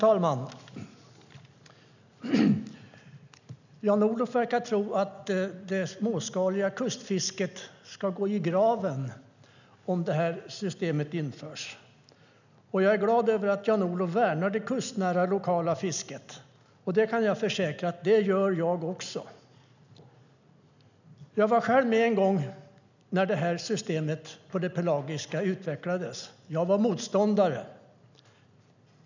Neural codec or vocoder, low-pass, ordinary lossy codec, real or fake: none; 7.2 kHz; none; real